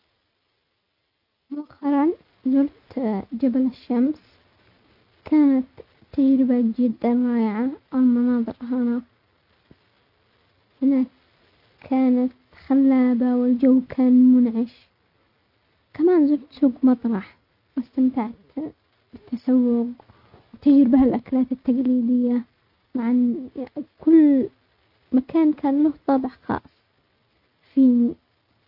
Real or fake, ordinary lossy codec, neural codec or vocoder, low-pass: real; none; none; 5.4 kHz